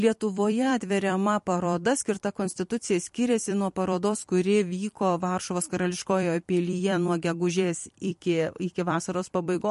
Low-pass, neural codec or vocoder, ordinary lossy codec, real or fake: 14.4 kHz; vocoder, 44.1 kHz, 128 mel bands every 256 samples, BigVGAN v2; MP3, 48 kbps; fake